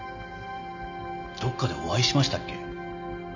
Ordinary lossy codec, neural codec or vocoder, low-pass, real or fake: none; none; 7.2 kHz; real